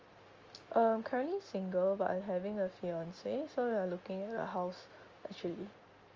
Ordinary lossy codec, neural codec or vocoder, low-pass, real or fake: Opus, 32 kbps; none; 7.2 kHz; real